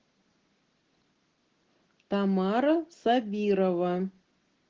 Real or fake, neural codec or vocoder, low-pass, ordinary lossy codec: real; none; 7.2 kHz; Opus, 16 kbps